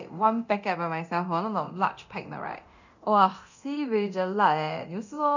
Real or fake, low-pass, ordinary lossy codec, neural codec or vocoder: fake; 7.2 kHz; none; codec, 24 kHz, 0.9 kbps, DualCodec